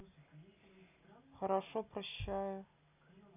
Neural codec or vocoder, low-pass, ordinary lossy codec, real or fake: none; 3.6 kHz; none; real